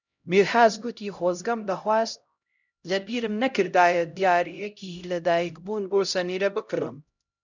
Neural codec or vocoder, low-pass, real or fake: codec, 16 kHz, 0.5 kbps, X-Codec, HuBERT features, trained on LibriSpeech; 7.2 kHz; fake